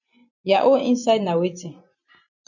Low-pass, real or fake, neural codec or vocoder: 7.2 kHz; fake; vocoder, 44.1 kHz, 128 mel bands every 512 samples, BigVGAN v2